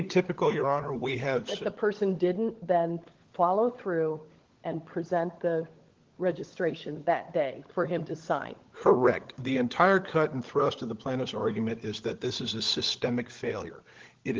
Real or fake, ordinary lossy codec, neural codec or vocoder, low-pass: fake; Opus, 16 kbps; codec, 16 kHz, 16 kbps, FunCodec, trained on LibriTTS, 50 frames a second; 7.2 kHz